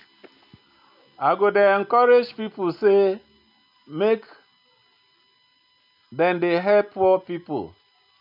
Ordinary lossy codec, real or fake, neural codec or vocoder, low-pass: none; real; none; 5.4 kHz